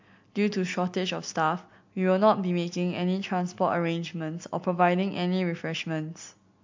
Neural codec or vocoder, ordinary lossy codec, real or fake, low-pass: none; MP3, 48 kbps; real; 7.2 kHz